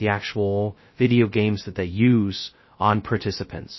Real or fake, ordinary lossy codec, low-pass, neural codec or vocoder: fake; MP3, 24 kbps; 7.2 kHz; codec, 16 kHz, 0.2 kbps, FocalCodec